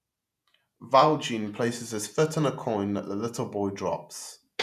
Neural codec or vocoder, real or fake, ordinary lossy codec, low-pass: vocoder, 48 kHz, 128 mel bands, Vocos; fake; none; 14.4 kHz